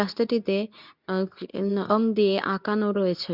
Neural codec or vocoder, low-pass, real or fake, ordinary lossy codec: codec, 24 kHz, 0.9 kbps, WavTokenizer, medium speech release version 2; 5.4 kHz; fake; none